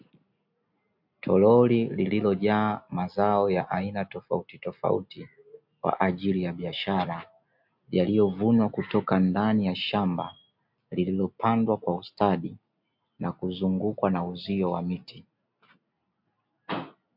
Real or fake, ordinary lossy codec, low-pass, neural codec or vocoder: real; MP3, 48 kbps; 5.4 kHz; none